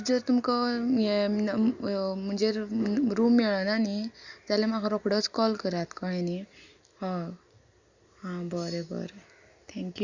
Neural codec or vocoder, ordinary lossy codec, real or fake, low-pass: none; Opus, 64 kbps; real; 7.2 kHz